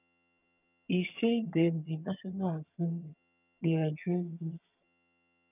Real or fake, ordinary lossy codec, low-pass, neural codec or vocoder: fake; none; 3.6 kHz; vocoder, 22.05 kHz, 80 mel bands, HiFi-GAN